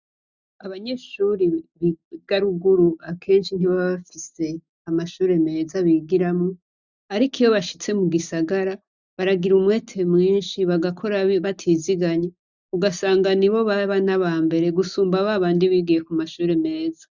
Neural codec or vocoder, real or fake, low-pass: none; real; 7.2 kHz